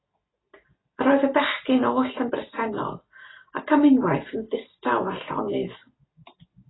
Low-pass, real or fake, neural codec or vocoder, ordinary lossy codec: 7.2 kHz; real; none; AAC, 16 kbps